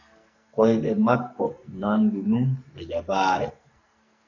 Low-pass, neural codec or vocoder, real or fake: 7.2 kHz; codec, 44.1 kHz, 2.6 kbps, SNAC; fake